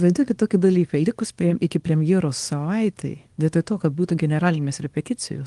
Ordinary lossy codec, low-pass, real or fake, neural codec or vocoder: Opus, 24 kbps; 10.8 kHz; fake; codec, 24 kHz, 0.9 kbps, WavTokenizer, small release